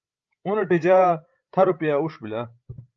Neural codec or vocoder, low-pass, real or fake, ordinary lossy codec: codec, 16 kHz, 16 kbps, FreqCodec, larger model; 7.2 kHz; fake; Opus, 24 kbps